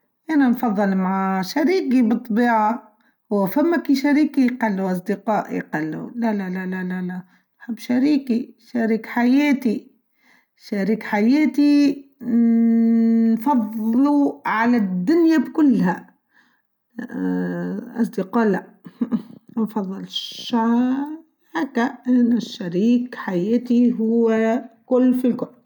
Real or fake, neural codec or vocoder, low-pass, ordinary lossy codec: real; none; 19.8 kHz; none